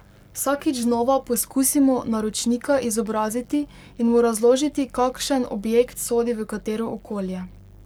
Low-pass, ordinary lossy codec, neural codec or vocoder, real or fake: none; none; codec, 44.1 kHz, 7.8 kbps, Pupu-Codec; fake